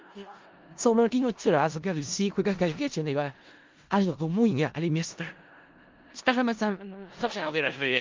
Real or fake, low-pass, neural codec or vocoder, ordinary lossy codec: fake; 7.2 kHz; codec, 16 kHz in and 24 kHz out, 0.4 kbps, LongCat-Audio-Codec, four codebook decoder; Opus, 32 kbps